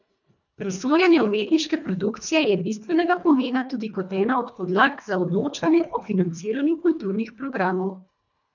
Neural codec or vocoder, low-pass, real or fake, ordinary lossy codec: codec, 24 kHz, 1.5 kbps, HILCodec; 7.2 kHz; fake; none